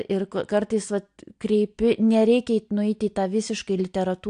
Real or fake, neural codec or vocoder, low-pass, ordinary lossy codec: real; none; 9.9 kHz; AAC, 64 kbps